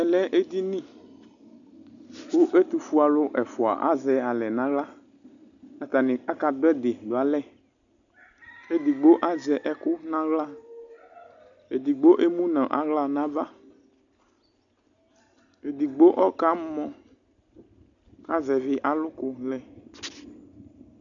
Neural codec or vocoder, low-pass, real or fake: none; 7.2 kHz; real